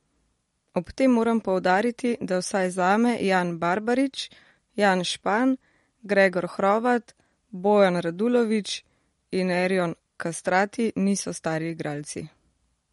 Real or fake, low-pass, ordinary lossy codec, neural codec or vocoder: real; 19.8 kHz; MP3, 48 kbps; none